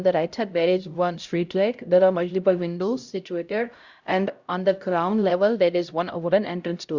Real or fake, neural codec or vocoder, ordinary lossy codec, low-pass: fake; codec, 16 kHz, 0.5 kbps, X-Codec, HuBERT features, trained on LibriSpeech; Opus, 64 kbps; 7.2 kHz